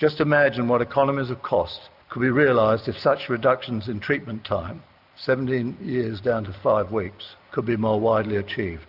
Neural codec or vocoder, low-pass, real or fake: none; 5.4 kHz; real